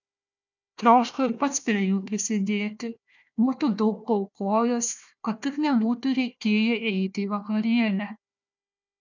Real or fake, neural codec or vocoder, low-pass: fake; codec, 16 kHz, 1 kbps, FunCodec, trained on Chinese and English, 50 frames a second; 7.2 kHz